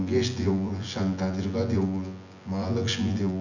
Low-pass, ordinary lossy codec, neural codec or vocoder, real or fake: 7.2 kHz; none; vocoder, 24 kHz, 100 mel bands, Vocos; fake